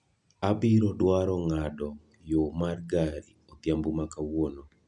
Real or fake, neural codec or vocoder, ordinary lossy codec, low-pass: real; none; none; 10.8 kHz